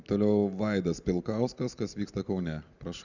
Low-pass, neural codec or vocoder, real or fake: 7.2 kHz; none; real